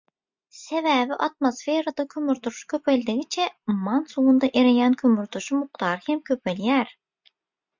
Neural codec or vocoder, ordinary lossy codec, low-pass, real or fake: none; MP3, 64 kbps; 7.2 kHz; real